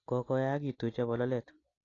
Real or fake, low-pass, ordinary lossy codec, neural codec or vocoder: real; 7.2 kHz; AAC, 32 kbps; none